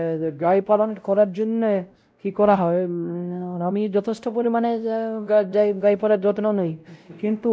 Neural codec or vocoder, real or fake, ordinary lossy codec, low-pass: codec, 16 kHz, 0.5 kbps, X-Codec, WavLM features, trained on Multilingual LibriSpeech; fake; none; none